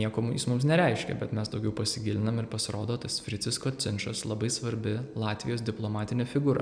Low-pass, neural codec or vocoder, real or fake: 9.9 kHz; none; real